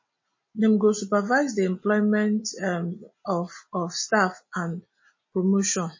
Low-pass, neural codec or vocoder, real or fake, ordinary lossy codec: 7.2 kHz; none; real; MP3, 32 kbps